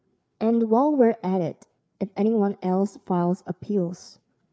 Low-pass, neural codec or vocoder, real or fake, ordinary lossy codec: none; codec, 16 kHz, 4 kbps, FreqCodec, larger model; fake; none